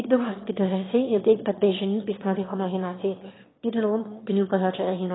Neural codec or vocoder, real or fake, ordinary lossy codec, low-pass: autoencoder, 22.05 kHz, a latent of 192 numbers a frame, VITS, trained on one speaker; fake; AAC, 16 kbps; 7.2 kHz